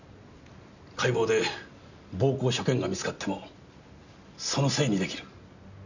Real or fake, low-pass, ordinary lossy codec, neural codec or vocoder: real; 7.2 kHz; none; none